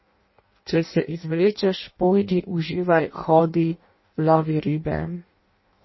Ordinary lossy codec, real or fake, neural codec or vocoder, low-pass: MP3, 24 kbps; fake; codec, 16 kHz in and 24 kHz out, 0.6 kbps, FireRedTTS-2 codec; 7.2 kHz